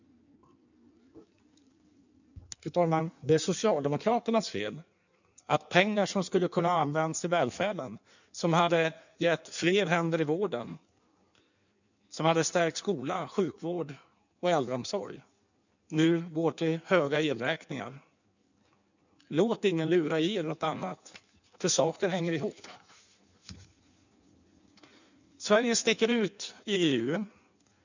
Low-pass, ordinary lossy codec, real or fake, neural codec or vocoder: 7.2 kHz; none; fake; codec, 16 kHz in and 24 kHz out, 1.1 kbps, FireRedTTS-2 codec